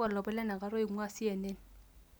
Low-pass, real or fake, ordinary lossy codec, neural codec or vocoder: none; real; none; none